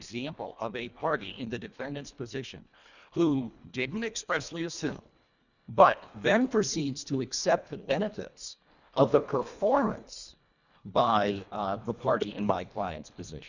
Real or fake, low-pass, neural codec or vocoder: fake; 7.2 kHz; codec, 24 kHz, 1.5 kbps, HILCodec